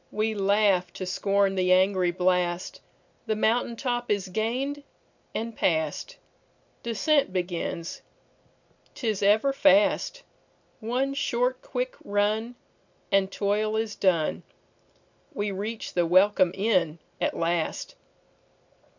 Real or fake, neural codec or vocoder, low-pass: real; none; 7.2 kHz